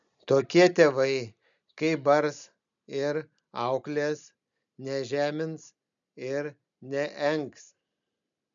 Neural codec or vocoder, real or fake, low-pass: none; real; 7.2 kHz